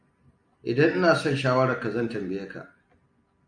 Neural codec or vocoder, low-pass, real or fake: none; 9.9 kHz; real